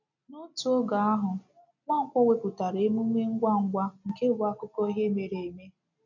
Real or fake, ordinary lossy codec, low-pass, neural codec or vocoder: real; none; 7.2 kHz; none